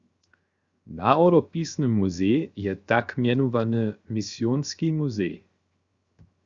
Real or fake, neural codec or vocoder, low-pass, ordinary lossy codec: fake; codec, 16 kHz, 0.7 kbps, FocalCodec; 7.2 kHz; Opus, 64 kbps